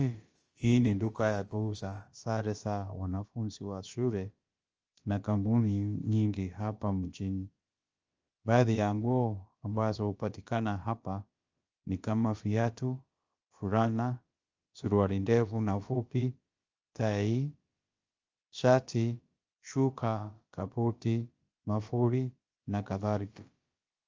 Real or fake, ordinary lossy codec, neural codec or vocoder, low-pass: fake; Opus, 24 kbps; codec, 16 kHz, about 1 kbps, DyCAST, with the encoder's durations; 7.2 kHz